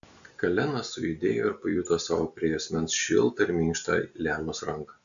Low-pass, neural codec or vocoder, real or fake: 7.2 kHz; none; real